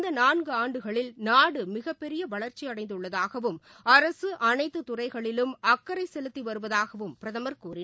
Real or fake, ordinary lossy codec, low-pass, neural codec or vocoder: real; none; none; none